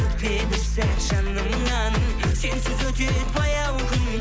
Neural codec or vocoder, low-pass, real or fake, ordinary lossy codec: none; none; real; none